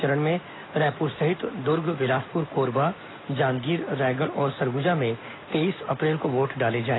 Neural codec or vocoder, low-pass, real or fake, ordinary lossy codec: none; 7.2 kHz; real; AAC, 16 kbps